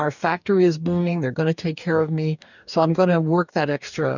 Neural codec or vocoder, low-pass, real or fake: codec, 44.1 kHz, 2.6 kbps, DAC; 7.2 kHz; fake